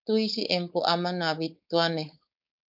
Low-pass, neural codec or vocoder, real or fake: 5.4 kHz; codec, 16 kHz, 4.8 kbps, FACodec; fake